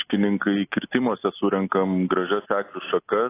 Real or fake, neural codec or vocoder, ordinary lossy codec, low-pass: real; none; AAC, 24 kbps; 3.6 kHz